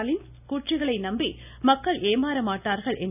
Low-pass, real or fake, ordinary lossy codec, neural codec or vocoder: 3.6 kHz; real; none; none